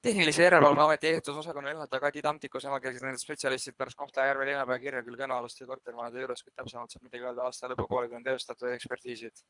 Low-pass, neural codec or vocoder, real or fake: 10.8 kHz; codec, 24 kHz, 3 kbps, HILCodec; fake